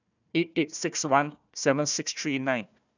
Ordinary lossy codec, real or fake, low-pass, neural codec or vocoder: none; fake; 7.2 kHz; codec, 16 kHz, 1 kbps, FunCodec, trained on Chinese and English, 50 frames a second